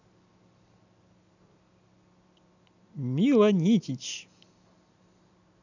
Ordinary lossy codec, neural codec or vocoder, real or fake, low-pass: none; none; real; 7.2 kHz